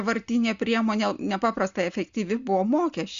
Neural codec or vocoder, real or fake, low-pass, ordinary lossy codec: none; real; 7.2 kHz; Opus, 64 kbps